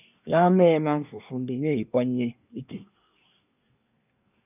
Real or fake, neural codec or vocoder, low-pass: fake; codec, 24 kHz, 1 kbps, SNAC; 3.6 kHz